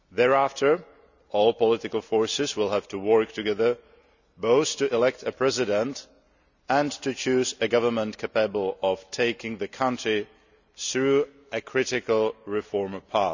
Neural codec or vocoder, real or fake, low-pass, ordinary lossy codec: none; real; 7.2 kHz; none